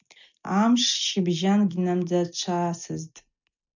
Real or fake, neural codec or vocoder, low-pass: real; none; 7.2 kHz